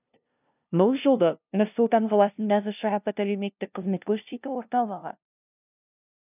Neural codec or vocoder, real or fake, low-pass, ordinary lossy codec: codec, 16 kHz, 0.5 kbps, FunCodec, trained on LibriTTS, 25 frames a second; fake; 3.6 kHz; none